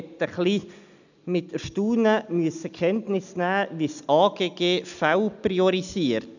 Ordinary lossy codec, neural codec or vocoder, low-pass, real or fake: none; none; 7.2 kHz; real